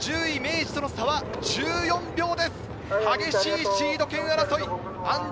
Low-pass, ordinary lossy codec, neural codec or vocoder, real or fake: none; none; none; real